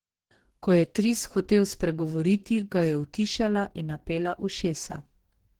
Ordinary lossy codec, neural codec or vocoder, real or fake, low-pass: Opus, 16 kbps; codec, 44.1 kHz, 2.6 kbps, DAC; fake; 19.8 kHz